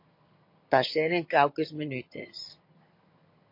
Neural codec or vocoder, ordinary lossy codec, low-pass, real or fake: vocoder, 22.05 kHz, 80 mel bands, HiFi-GAN; MP3, 32 kbps; 5.4 kHz; fake